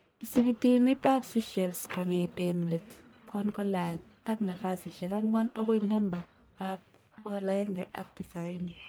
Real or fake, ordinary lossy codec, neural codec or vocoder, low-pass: fake; none; codec, 44.1 kHz, 1.7 kbps, Pupu-Codec; none